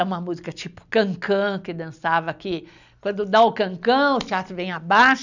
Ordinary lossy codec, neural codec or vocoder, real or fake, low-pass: none; none; real; 7.2 kHz